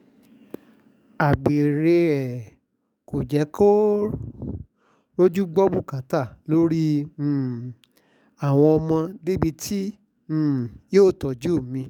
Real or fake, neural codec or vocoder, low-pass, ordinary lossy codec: fake; codec, 44.1 kHz, 7.8 kbps, DAC; 19.8 kHz; none